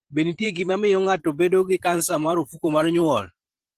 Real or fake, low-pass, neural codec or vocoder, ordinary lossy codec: fake; 14.4 kHz; vocoder, 44.1 kHz, 128 mel bands every 512 samples, BigVGAN v2; Opus, 16 kbps